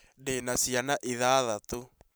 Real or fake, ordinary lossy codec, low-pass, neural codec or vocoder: fake; none; none; vocoder, 44.1 kHz, 128 mel bands every 512 samples, BigVGAN v2